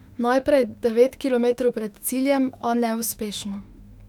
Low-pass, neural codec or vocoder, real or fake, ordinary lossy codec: 19.8 kHz; autoencoder, 48 kHz, 32 numbers a frame, DAC-VAE, trained on Japanese speech; fake; none